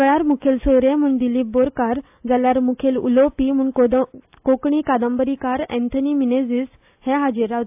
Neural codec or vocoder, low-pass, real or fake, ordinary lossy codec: none; 3.6 kHz; real; AAC, 32 kbps